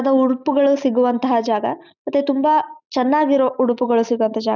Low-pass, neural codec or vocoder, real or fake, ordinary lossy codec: 7.2 kHz; none; real; none